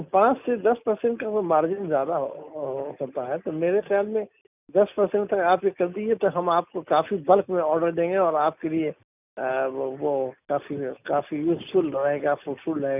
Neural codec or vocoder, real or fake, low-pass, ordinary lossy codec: none; real; 3.6 kHz; none